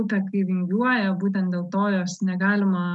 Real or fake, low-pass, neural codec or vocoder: real; 10.8 kHz; none